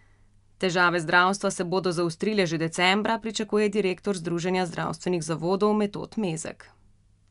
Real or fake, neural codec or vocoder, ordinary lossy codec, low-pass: real; none; none; 10.8 kHz